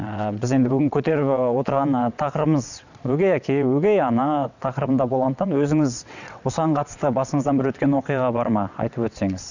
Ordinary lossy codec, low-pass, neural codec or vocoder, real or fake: none; 7.2 kHz; vocoder, 22.05 kHz, 80 mel bands, WaveNeXt; fake